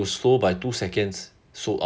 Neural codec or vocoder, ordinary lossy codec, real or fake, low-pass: none; none; real; none